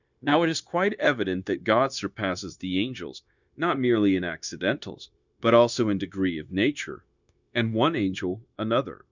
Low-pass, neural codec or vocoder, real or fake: 7.2 kHz; codec, 16 kHz, 0.9 kbps, LongCat-Audio-Codec; fake